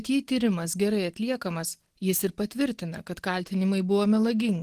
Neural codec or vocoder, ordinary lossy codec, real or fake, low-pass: none; Opus, 16 kbps; real; 14.4 kHz